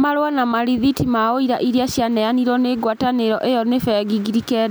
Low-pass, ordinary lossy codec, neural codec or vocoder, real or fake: none; none; none; real